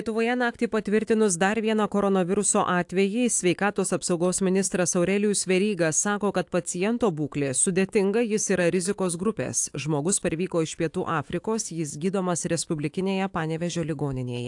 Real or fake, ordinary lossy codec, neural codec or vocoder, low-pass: fake; AAC, 64 kbps; autoencoder, 48 kHz, 128 numbers a frame, DAC-VAE, trained on Japanese speech; 10.8 kHz